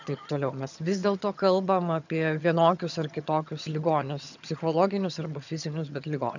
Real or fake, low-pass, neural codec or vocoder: fake; 7.2 kHz; vocoder, 22.05 kHz, 80 mel bands, HiFi-GAN